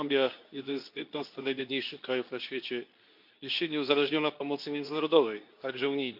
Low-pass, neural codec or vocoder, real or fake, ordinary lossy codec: 5.4 kHz; codec, 24 kHz, 0.9 kbps, WavTokenizer, medium speech release version 2; fake; none